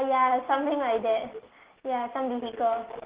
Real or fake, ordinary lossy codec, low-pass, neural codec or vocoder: real; Opus, 16 kbps; 3.6 kHz; none